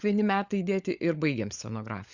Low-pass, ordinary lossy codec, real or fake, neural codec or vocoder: 7.2 kHz; Opus, 64 kbps; fake; codec, 16 kHz, 16 kbps, FunCodec, trained on LibriTTS, 50 frames a second